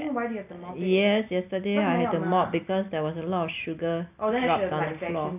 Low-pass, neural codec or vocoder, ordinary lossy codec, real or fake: 3.6 kHz; none; AAC, 32 kbps; real